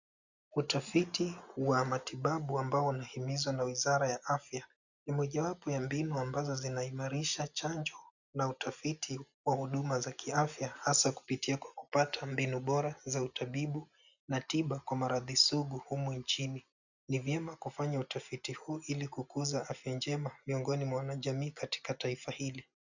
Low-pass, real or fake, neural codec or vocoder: 7.2 kHz; real; none